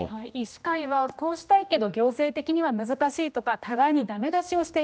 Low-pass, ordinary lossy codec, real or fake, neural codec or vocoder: none; none; fake; codec, 16 kHz, 1 kbps, X-Codec, HuBERT features, trained on general audio